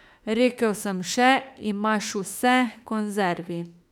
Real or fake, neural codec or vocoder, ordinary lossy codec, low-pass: fake; autoencoder, 48 kHz, 32 numbers a frame, DAC-VAE, trained on Japanese speech; none; 19.8 kHz